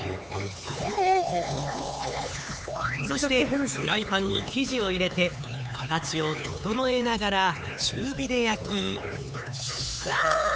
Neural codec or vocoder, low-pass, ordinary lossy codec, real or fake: codec, 16 kHz, 4 kbps, X-Codec, HuBERT features, trained on LibriSpeech; none; none; fake